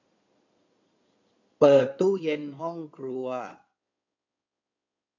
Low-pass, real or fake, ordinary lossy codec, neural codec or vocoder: 7.2 kHz; fake; none; codec, 16 kHz in and 24 kHz out, 2.2 kbps, FireRedTTS-2 codec